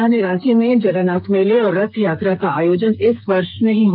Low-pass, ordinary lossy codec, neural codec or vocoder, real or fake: 5.4 kHz; none; codec, 32 kHz, 1.9 kbps, SNAC; fake